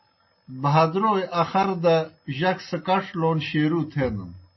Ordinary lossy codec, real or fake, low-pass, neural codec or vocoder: MP3, 24 kbps; real; 7.2 kHz; none